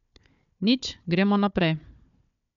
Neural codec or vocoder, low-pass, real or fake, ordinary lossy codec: codec, 16 kHz, 4 kbps, FunCodec, trained on Chinese and English, 50 frames a second; 7.2 kHz; fake; none